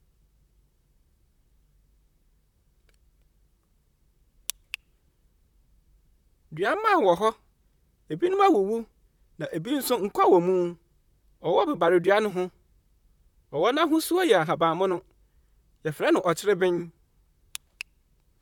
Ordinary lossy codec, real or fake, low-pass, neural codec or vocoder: none; fake; 19.8 kHz; vocoder, 44.1 kHz, 128 mel bands, Pupu-Vocoder